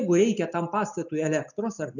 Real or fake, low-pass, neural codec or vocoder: real; 7.2 kHz; none